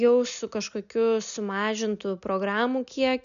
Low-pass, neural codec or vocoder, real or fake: 7.2 kHz; none; real